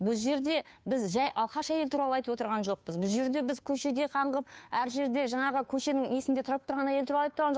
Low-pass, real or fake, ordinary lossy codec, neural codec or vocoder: none; fake; none; codec, 16 kHz, 2 kbps, FunCodec, trained on Chinese and English, 25 frames a second